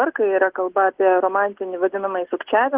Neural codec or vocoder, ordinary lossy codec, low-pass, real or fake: none; Opus, 16 kbps; 3.6 kHz; real